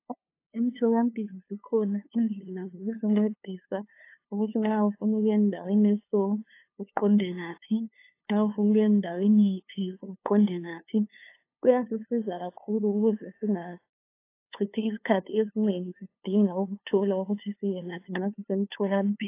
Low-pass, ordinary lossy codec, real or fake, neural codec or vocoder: 3.6 kHz; AAC, 24 kbps; fake; codec, 16 kHz, 2 kbps, FunCodec, trained on LibriTTS, 25 frames a second